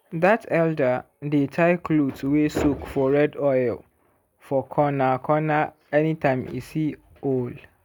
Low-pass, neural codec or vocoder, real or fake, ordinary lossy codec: 19.8 kHz; none; real; none